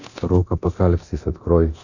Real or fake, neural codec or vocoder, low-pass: fake; codec, 24 kHz, 0.9 kbps, DualCodec; 7.2 kHz